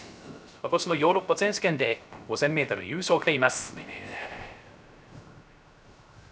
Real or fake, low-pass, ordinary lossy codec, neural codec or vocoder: fake; none; none; codec, 16 kHz, 0.3 kbps, FocalCodec